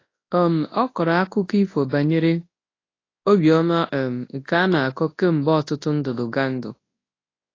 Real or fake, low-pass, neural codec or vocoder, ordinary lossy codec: fake; 7.2 kHz; codec, 24 kHz, 0.9 kbps, WavTokenizer, large speech release; AAC, 32 kbps